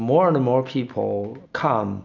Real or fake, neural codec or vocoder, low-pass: real; none; 7.2 kHz